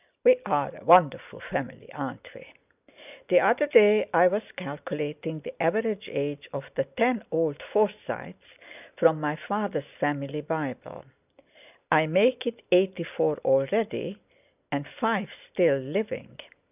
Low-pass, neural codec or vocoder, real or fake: 3.6 kHz; none; real